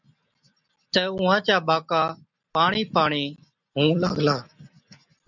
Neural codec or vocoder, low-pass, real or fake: none; 7.2 kHz; real